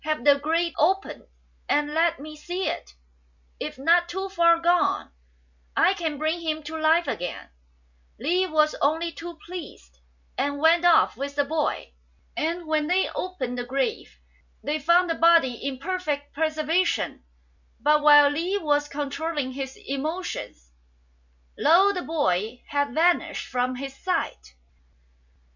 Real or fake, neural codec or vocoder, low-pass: real; none; 7.2 kHz